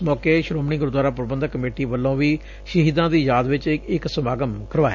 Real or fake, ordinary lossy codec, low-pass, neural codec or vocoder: real; none; 7.2 kHz; none